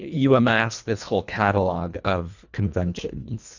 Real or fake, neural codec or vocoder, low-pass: fake; codec, 24 kHz, 1.5 kbps, HILCodec; 7.2 kHz